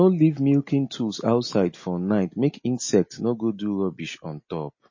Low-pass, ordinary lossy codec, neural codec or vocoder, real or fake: 7.2 kHz; MP3, 32 kbps; none; real